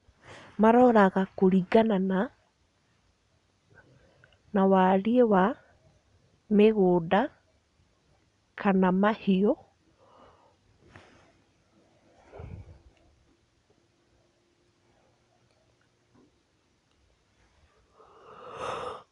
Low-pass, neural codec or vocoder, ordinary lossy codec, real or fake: 10.8 kHz; none; none; real